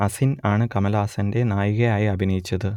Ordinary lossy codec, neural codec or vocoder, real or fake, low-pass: none; none; real; 19.8 kHz